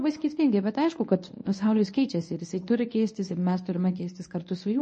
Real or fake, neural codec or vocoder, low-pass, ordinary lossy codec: fake; codec, 24 kHz, 0.9 kbps, WavTokenizer, medium speech release version 1; 10.8 kHz; MP3, 32 kbps